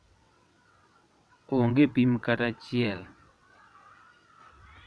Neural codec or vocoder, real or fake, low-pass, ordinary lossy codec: vocoder, 22.05 kHz, 80 mel bands, WaveNeXt; fake; none; none